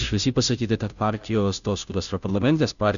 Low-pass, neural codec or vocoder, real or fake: 7.2 kHz; codec, 16 kHz, 0.5 kbps, FunCodec, trained on Chinese and English, 25 frames a second; fake